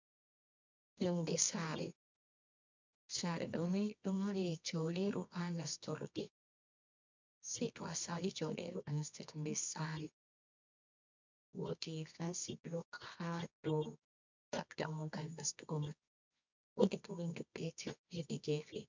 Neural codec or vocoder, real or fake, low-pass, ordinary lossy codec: codec, 24 kHz, 0.9 kbps, WavTokenizer, medium music audio release; fake; 7.2 kHz; MP3, 64 kbps